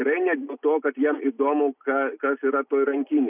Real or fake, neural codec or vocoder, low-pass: real; none; 3.6 kHz